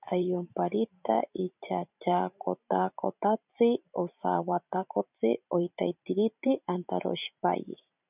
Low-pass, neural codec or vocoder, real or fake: 3.6 kHz; vocoder, 44.1 kHz, 128 mel bands every 512 samples, BigVGAN v2; fake